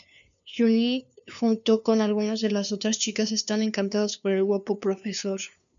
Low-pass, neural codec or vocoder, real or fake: 7.2 kHz; codec, 16 kHz, 2 kbps, FunCodec, trained on LibriTTS, 25 frames a second; fake